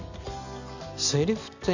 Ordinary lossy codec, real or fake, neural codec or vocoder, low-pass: none; real; none; 7.2 kHz